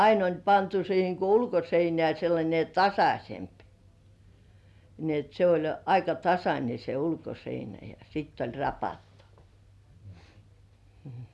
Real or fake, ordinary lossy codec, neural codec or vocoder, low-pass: real; none; none; none